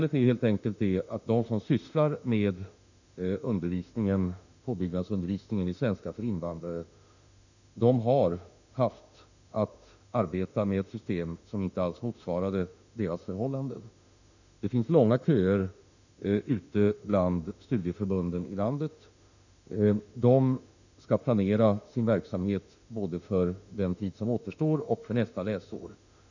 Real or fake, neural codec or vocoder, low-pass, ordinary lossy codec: fake; autoencoder, 48 kHz, 32 numbers a frame, DAC-VAE, trained on Japanese speech; 7.2 kHz; none